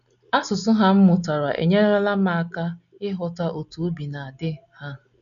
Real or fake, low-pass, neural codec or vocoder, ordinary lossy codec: real; 7.2 kHz; none; none